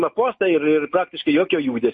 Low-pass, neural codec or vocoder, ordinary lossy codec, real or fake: 10.8 kHz; none; MP3, 32 kbps; real